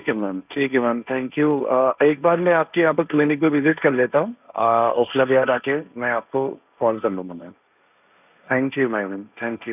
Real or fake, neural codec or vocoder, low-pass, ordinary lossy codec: fake; codec, 16 kHz, 1.1 kbps, Voila-Tokenizer; 3.6 kHz; none